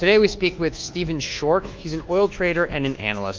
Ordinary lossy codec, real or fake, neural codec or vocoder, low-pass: Opus, 24 kbps; fake; codec, 24 kHz, 1.2 kbps, DualCodec; 7.2 kHz